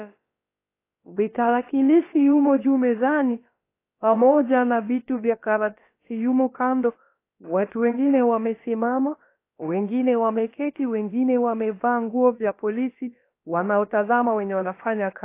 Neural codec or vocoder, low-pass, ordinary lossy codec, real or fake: codec, 16 kHz, about 1 kbps, DyCAST, with the encoder's durations; 3.6 kHz; AAC, 24 kbps; fake